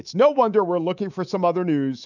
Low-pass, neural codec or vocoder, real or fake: 7.2 kHz; codec, 24 kHz, 3.1 kbps, DualCodec; fake